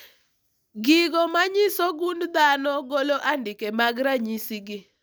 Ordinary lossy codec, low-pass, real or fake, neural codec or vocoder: none; none; real; none